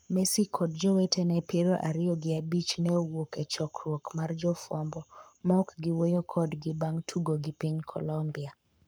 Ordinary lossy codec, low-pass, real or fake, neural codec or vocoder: none; none; fake; codec, 44.1 kHz, 7.8 kbps, Pupu-Codec